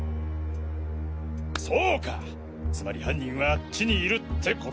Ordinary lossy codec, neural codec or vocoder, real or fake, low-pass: none; none; real; none